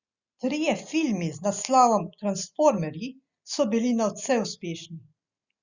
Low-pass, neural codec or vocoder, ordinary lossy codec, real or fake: 7.2 kHz; none; Opus, 64 kbps; real